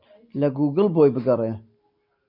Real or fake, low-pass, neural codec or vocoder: real; 5.4 kHz; none